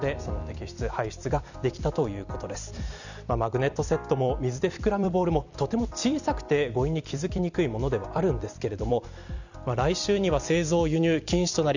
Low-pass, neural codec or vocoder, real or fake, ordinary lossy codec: 7.2 kHz; vocoder, 44.1 kHz, 128 mel bands every 256 samples, BigVGAN v2; fake; none